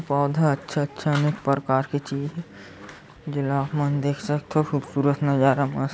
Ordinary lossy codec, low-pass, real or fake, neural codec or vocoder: none; none; real; none